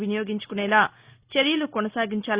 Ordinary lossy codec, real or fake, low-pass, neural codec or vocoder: Opus, 64 kbps; real; 3.6 kHz; none